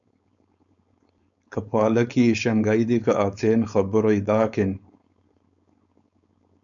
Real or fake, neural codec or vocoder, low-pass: fake; codec, 16 kHz, 4.8 kbps, FACodec; 7.2 kHz